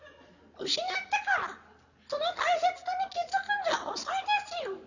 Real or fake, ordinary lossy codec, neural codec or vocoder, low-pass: real; none; none; 7.2 kHz